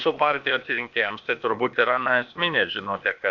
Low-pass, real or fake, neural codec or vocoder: 7.2 kHz; fake; codec, 16 kHz, 0.8 kbps, ZipCodec